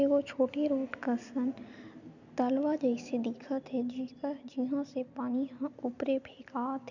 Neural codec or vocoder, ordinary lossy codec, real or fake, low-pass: none; none; real; 7.2 kHz